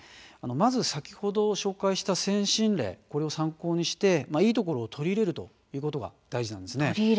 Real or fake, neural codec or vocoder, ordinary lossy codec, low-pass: real; none; none; none